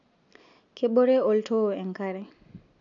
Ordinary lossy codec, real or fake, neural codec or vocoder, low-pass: none; real; none; 7.2 kHz